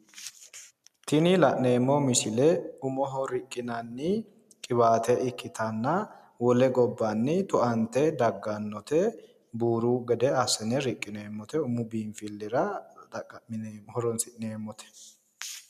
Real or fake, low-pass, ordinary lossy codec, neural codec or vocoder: real; 14.4 kHz; MP3, 96 kbps; none